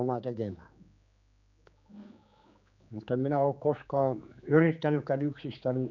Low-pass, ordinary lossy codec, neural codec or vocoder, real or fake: 7.2 kHz; none; codec, 16 kHz, 4 kbps, X-Codec, HuBERT features, trained on general audio; fake